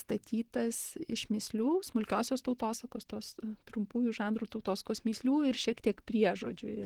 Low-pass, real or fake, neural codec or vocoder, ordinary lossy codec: 19.8 kHz; fake; vocoder, 44.1 kHz, 128 mel bands, Pupu-Vocoder; Opus, 16 kbps